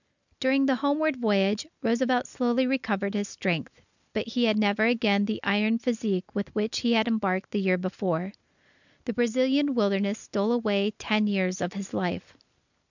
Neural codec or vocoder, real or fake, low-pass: none; real; 7.2 kHz